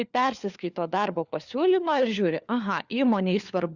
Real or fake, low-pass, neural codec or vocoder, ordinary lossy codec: fake; 7.2 kHz; codec, 16 kHz, 4 kbps, FunCodec, trained on LibriTTS, 50 frames a second; Opus, 64 kbps